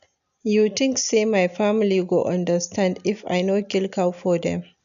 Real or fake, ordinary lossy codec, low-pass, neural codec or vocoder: real; none; 7.2 kHz; none